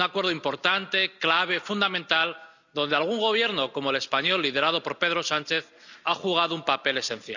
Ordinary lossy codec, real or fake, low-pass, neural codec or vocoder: none; real; 7.2 kHz; none